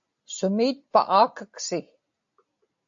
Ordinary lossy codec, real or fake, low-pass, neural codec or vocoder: AAC, 64 kbps; real; 7.2 kHz; none